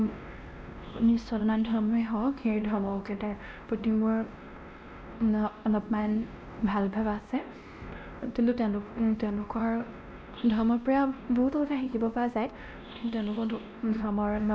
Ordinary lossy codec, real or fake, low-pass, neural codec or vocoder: none; fake; none; codec, 16 kHz, 1 kbps, X-Codec, WavLM features, trained on Multilingual LibriSpeech